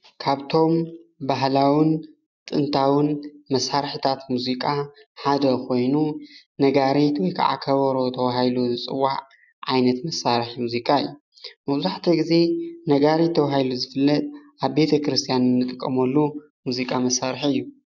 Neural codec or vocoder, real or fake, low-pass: none; real; 7.2 kHz